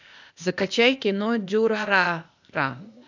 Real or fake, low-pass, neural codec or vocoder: fake; 7.2 kHz; codec, 16 kHz, 0.8 kbps, ZipCodec